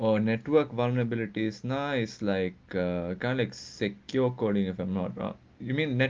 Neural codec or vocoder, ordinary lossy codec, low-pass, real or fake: none; Opus, 32 kbps; 7.2 kHz; real